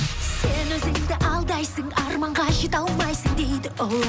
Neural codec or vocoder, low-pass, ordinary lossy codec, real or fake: none; none; none; real